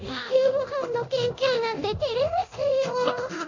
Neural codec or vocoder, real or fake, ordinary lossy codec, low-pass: codec, 24 kHz, 0.9 kbps, DualCodec; fake; MP3, 48 kbps; 7.2 kHz